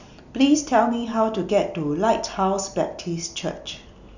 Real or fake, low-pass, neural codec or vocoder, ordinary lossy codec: real; 7.2 kHz; none; none